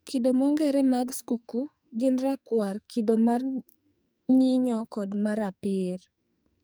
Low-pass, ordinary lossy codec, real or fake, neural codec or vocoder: none; none; fake; codec, 44.1 kHz, 2.6 kbps, SNAC